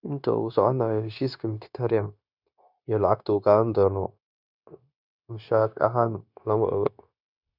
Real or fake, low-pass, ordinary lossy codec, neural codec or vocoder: fake; 5.4 kHz; none; codec, 16 kHz, 0.9 kbps, LongCat-Audio-Codec